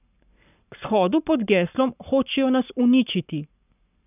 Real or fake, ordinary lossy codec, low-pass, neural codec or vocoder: fake; none; 3.6 kHz; codec, 44.1 kHz, 7.8 kbps, Pupu-Codec